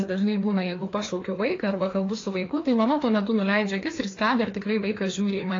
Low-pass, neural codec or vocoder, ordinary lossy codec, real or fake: 7.2 kHz; codec, 16 kHz, 2 kbps, FreqCodec, larger model; AAC, 32 kbps; fake